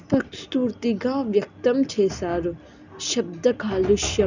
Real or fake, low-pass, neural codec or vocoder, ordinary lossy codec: real; 7.2 kHz; none; none